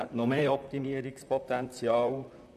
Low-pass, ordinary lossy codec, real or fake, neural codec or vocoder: 14.4 kHz; none; fake; vocoder, 44.1 kHz, 128 mel bands, Pupu-Vocoder